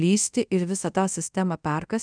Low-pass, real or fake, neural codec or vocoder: 9.9 kHz; fake; codec, 24 kHz, 0.5 kbps, DualCodec